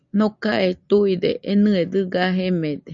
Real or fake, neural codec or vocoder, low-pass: real; none; 7.2 kHz